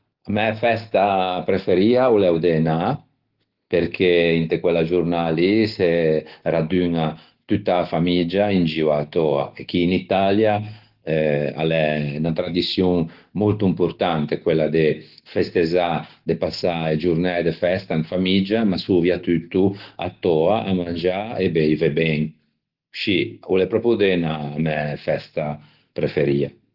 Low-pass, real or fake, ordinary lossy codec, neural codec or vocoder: 5.4 kHz; real; Opus, 16 kbps; none